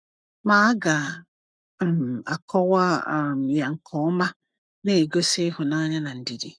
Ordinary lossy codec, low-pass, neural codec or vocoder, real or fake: none; 9.9 kHz; codec, 44.1 kHz, 7.8 kbps, Pupu-Codec; fake